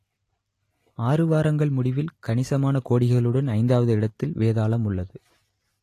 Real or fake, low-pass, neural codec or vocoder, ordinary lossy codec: real; 14.4 kHz; none; AAC, 48 kbps